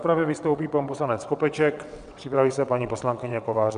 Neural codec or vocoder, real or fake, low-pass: vocoder, 22.05 kHz, 80 mel bands, WaveNeXt; fake; 9.9 kHz